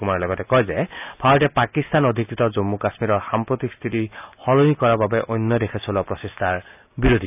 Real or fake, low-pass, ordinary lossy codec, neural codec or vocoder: real; 3.6 kHz; none; none